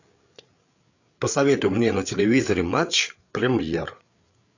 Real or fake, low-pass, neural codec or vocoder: fake; 7.2 kHz; codec, 16 kHz, 8 kbps, FreqCodec, larger model